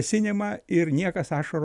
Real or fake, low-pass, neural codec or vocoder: real; 10.8 kHz; none